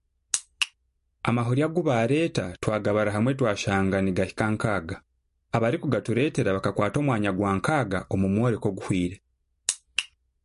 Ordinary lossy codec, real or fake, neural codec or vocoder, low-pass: MP3, 48 kbps; real; none; 14.4 kHz